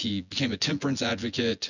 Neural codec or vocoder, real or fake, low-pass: vocoder, 24 kHz, 100 mel bands, Vocos; fake; 7.2 kHz